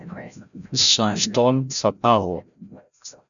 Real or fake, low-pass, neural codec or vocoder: fake; 7.2 kHz; codec, 16 kHz, 0.5 kbps, FreqCodec, larger model